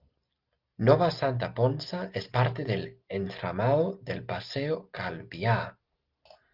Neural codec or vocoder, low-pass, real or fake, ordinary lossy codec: none; 5.4 kHz; real; Opus, 32 kbps